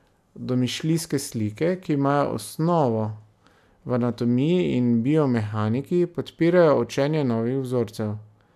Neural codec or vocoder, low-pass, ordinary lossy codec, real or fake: none; 14.4 kHz; none; real